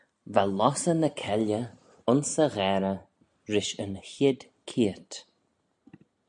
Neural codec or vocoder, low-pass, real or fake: none; 9.9 kHz; real